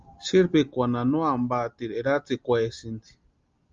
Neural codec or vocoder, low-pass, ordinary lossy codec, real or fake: none; 7.2 kHz; Opus, 32 kbps; real